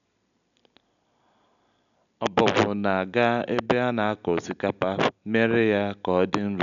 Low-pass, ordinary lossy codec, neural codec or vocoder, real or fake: 7.2 kHz; none; none; real